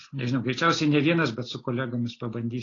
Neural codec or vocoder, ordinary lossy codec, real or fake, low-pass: none; AAC, 32 kbps; real; 7.2 kHz